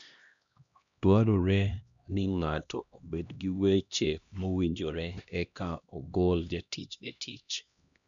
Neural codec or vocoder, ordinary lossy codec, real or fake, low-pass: codec, 16 kHz, 1 kbps, X-Codec, HuBERT features, trained on LibriSpeech; none; fake; 7.2 kHz